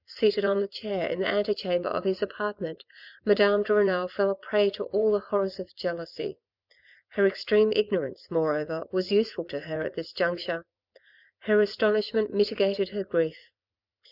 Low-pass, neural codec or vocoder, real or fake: 5.4 kHz; vocoder, 44.1 kHz, 80 mel bands, Vocos; fake